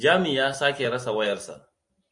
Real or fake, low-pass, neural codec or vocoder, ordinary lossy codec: real; 10.8 kHz; none; MP3, 48 kbps